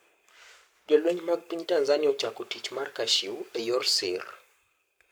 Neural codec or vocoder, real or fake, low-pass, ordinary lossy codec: codec, 44.1 kHz, 7.8 kbps, Pupu-Codec; fake; none; none